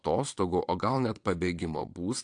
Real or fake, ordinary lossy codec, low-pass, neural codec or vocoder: fake; AAC, 64 kbps; 9.9 kHz; vocoder, 22.05 kHz, 80 mel bands, WaveNeXt